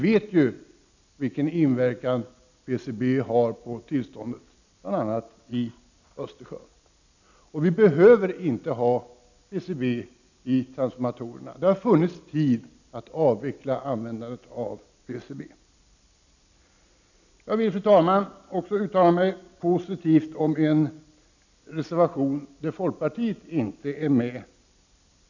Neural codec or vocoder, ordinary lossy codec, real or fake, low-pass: none; none; real; 7.2 kHz